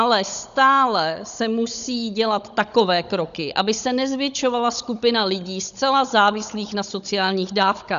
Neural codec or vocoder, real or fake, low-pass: codec, 16 kHz, 16 kbps, FunCodec, trained on Chinese and English, 50 frames a second; fake; 7.2 kHz